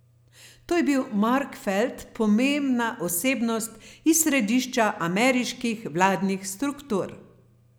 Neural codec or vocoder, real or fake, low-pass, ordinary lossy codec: none; real; none; none